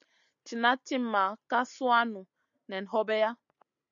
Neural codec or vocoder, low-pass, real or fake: none; 7.2 kHz; real